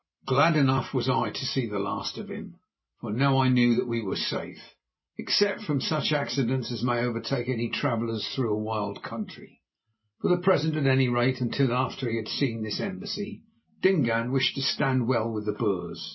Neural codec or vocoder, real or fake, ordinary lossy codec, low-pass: none; real; MP3, 24 kbps; 7.2 kHz